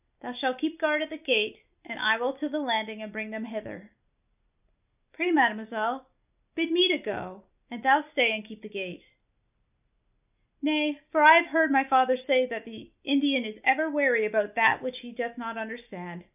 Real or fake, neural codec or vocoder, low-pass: real; none; 3.6 kHz